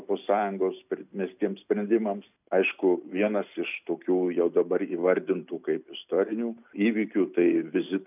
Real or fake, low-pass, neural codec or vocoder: real; 3.6 kHz; none